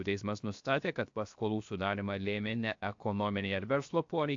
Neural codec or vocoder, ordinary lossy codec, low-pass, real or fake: codec, 16 kHz, 0.7 kbps, FocalCodec; AAC, 64 kbps; 7.2 kHz; fake